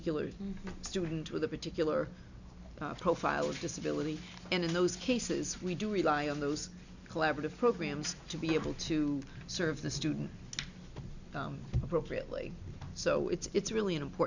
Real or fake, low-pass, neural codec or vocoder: real; 7.2 kHz; none